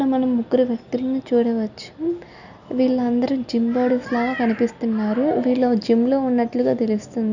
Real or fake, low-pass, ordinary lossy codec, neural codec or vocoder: real; 7.2 kHz; none; none